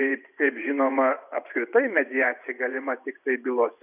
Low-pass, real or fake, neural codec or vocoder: 3.6 kHz; fake; vocoder, 24 kHz, 100 mel bands, Vocos